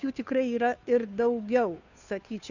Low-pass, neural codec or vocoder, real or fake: 7.2 kHz; codec, 16 kHz, 2 kbps, FunCodec, trained on Chinese and English, 25 frames a second; fake